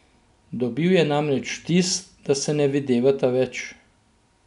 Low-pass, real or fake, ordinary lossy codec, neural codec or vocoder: 10.8 kHz; real; none; none